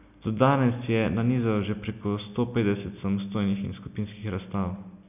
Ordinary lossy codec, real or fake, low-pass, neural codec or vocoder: none; real; 3.6 kHz; none